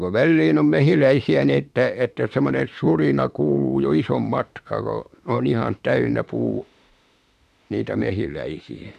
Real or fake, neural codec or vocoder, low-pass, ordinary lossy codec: fake; autoencoder, 48 kHz, 32 numbers a frame, DAC-VAE, trained on Japanese speech; 14.4 kHz; AAC, 96 kbps